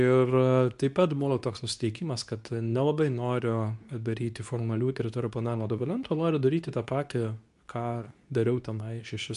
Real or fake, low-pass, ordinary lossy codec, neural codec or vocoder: fake; 10.8 kHz; MP3, 96 kbps; codec, 24 kHz, 0.9 kbps, WavTokenizer, medium speech release version 2